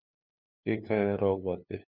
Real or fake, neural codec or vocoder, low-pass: fake; codec, 16 kHz, 2 kbps, FunCodec, trained on LibriTTS, 25 frames a second; 5.4 kHz